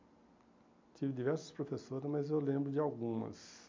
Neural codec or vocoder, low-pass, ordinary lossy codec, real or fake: none; 7.2 kHz; MP3, 48 kbps; real